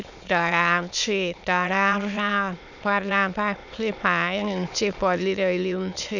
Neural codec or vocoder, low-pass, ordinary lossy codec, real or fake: autoencoder, 22.05 kHz, a latent of 192 numbers a frame, VITS, trained on many speakers; 7.2 kHz; none; fake